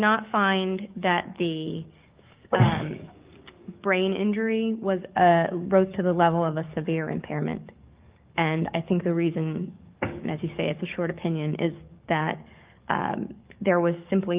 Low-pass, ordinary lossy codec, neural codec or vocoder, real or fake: 3.6 kHz; Opus, 32 kbps; codec, 44.1 kHz, 7.8 kbps, DAC; fake